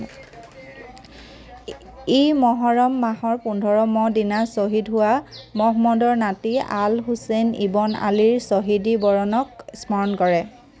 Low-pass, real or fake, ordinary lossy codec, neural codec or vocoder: none; real; none; none